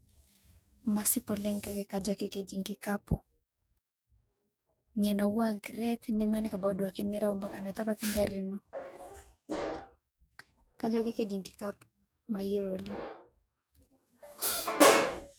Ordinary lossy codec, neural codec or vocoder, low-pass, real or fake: none; codec, 44.1 kHz, 2.6 kbps, DAC; none; fake